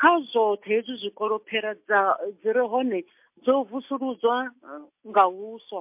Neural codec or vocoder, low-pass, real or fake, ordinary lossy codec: none; 3.6 kHz; real; MP3, 32 kbps